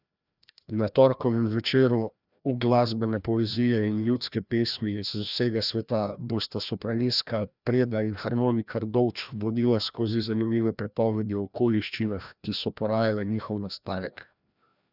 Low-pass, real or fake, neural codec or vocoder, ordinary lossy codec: 5.4 kHz; fake; codec, 16 kHz, 1 kbps, FreqCodec, larger model; none